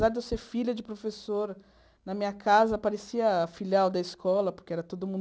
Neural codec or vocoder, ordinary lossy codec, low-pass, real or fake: none; none; none; real